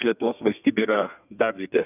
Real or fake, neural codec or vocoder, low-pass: fake; codec, 32 kHz, 1.9 kbps, SNAC; 3.6 kHz